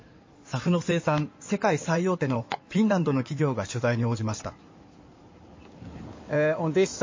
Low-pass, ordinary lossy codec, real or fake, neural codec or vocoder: 7.2 kHz; MP3, 32 kbps; fake; codec, 16 kHz in and 24 kHz out, 2.2 kbps, FireRedTTS-2 codec